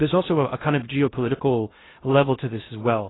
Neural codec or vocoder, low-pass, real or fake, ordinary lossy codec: codec, 16 kHz in and 24 kHz out, 0.6 kbps, FocalCodec, streaming, 2048 codes; 7.2 kHz; fake; AAC, 16 kbps